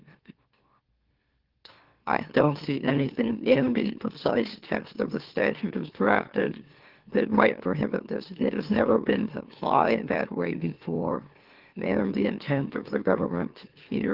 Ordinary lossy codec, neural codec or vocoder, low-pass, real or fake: Opus, 16 kbps; autoencoder, 44.1 kHz, a latent of 192 numbers a frame, MeloTTS; 5.4 kHz; fake